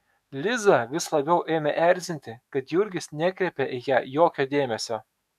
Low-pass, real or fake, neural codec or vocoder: 14.4 kHz; fake; autoencoder, 48 kHz, 128 numbers a frame, DAC-VAE, trained on Japanese speech